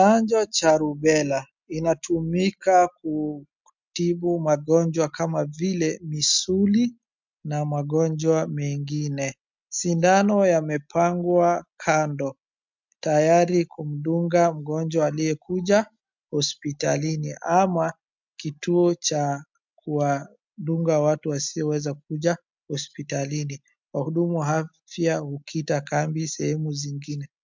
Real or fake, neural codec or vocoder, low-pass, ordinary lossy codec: real; none; 7.2 kHz; MP3, 64 kbps